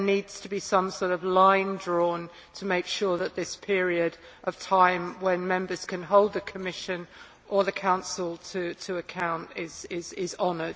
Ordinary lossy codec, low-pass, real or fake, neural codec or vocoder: none; none; real; none